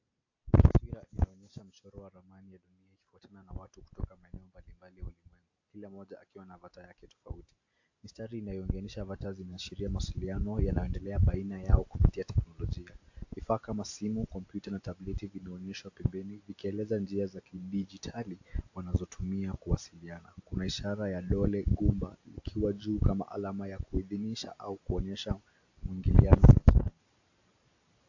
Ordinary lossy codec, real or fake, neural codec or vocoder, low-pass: AAC, 48 kbps; real; none; 7.2 kHz